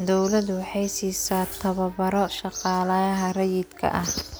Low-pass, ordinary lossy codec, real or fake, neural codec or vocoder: none; none; real; none